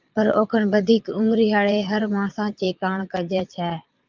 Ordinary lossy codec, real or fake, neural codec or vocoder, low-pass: Opus, 24 kbps; fake; vocoder, 22.05 kHz, 80 mel bands, Vocos; 7.2 kHz